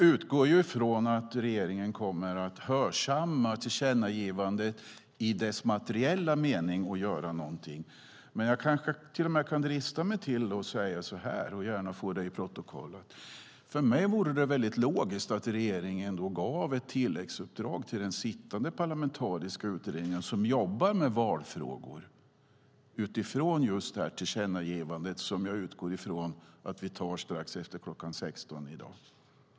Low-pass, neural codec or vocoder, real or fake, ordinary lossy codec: none; none; real; none